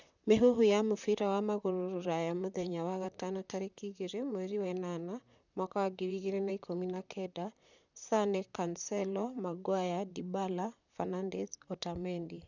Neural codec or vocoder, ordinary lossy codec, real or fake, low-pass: vocoder, 44.1 kHz, 128 mel bands, Pupu-Vocoder; none; fake; 7.2 kHz